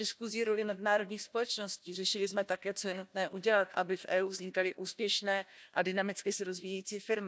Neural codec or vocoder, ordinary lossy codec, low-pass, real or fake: codec, 16 kHz, 1 kbps, FunCodec, trained on Chinese and English, 50 frames a second; none; none; fake